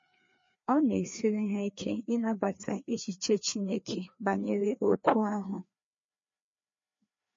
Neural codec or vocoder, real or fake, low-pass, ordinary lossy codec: codec, 16 kHz, 2 kbps, FreqCodec, larger model; fake; 7.2 kHz; MP3, 32 kbps